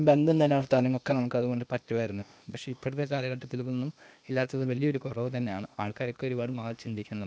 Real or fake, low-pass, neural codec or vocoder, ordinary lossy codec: fake; none; codec, 16 kHz, 0.8 kbps, ZipCodec; none